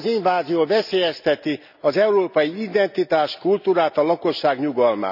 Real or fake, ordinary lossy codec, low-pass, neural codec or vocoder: real; none; 5.4 kHz; none